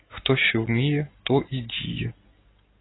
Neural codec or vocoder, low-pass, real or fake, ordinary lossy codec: none; 7.2 kHz; real; AAC, 16 kbps